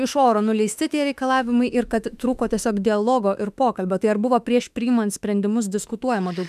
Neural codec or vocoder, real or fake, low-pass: autoencoder, 48 kHz, 32 numbers a frame, DAC-VAE, trained on Japanese speech; fake; 14.4 kHz